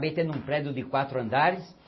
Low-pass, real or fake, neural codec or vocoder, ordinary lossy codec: 7.2 kHz; real; none; MP3, 24 kbps